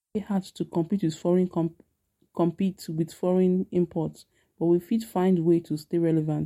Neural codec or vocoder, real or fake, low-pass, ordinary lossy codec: none; real; 19.8 kHz; MP3, 64 kbps